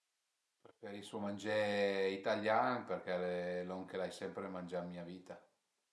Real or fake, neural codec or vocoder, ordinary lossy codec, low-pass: real; none; none; none